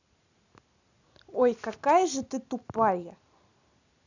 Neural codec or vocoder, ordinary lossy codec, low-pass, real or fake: none; none; 7.2 kHz; real